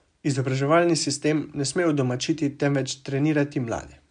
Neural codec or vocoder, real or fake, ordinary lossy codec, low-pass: none; real; MP3, 64 kbps; 9.9 kHz